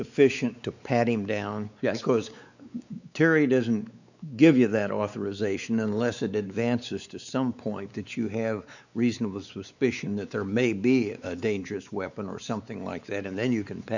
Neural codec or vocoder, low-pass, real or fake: codec, 16 kHz, 4 kbps, X-Codec, WavLM features, trained on Multilingual LibriSpeech; 7.2 kHz; fake